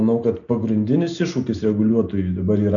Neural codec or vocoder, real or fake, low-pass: none; real; 7.2 kHz